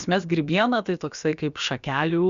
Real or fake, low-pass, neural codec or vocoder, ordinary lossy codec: fake; 7.2 kHz; codec, 16 kHz, about 1 kbps, DyCAST, with the encoder's durations; Opus, 64 kbps